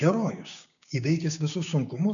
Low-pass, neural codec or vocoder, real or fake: 7.2 kHz; codec, 16 kHz, 6 kbps, DAC; fake